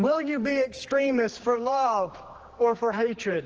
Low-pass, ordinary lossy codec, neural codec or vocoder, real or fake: 7.2 kHz; Opus, 16 kbps; codec, 16 kHz, 2 kbps, X-Codec, HuBERT features, trained on general audio; fake